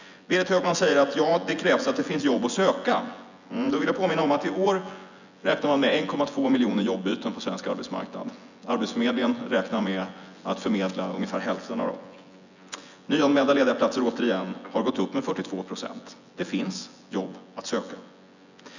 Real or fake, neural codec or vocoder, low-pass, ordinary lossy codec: fake; vocoder, 24 kHz, 100 mel bands, Vocos; 7.2 kHz; none